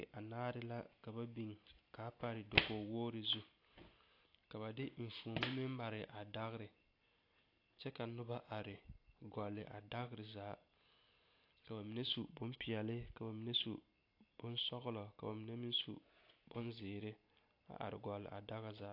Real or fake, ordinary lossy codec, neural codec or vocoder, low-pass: real; AAC, 32 kbps; none; 5.4 kHz